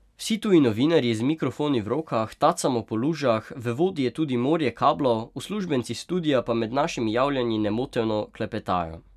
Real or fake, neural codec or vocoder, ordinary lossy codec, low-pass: real; none; none; 14.4 kHz